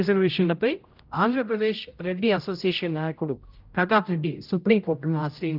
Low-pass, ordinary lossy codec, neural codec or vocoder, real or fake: 5.4 kHz; Opus, 24 kbps; codec, 16 kHz, 0.5 kbps, X-Codec, HuBERT features, trained on general audio; fake